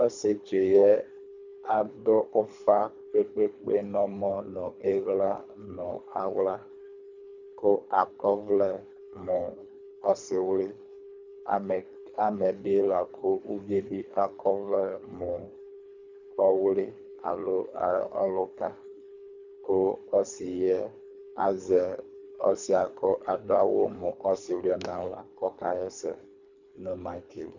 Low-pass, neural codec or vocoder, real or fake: 7.2 kHz; codec, 24 kHz, 3 kbps, HILCodec; fake